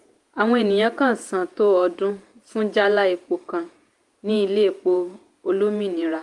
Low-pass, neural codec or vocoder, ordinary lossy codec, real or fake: 10.8 kHz; vocoder, 48 kHz, 128 mel bands, Vocos; Opus, 32 kbps; fake